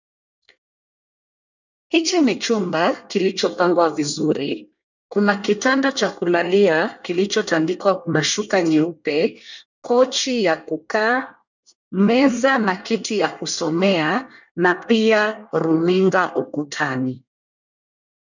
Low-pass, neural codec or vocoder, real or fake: 7.2 kHz; codec, 24 kHz, 1 kbps, SNAC; fake